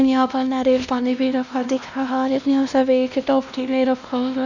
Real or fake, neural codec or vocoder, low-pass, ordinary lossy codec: fake; codec, 16 kHz, 1 kbps, X-Codec, WavLM features, trained on Multilingual LibriSpeech; 7.2 kHz; none